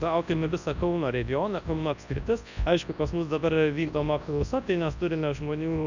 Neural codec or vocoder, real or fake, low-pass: codec, 24 kHz, 0.9 kbps, WavTokenizer, large speech release; fake; 7.2 kHz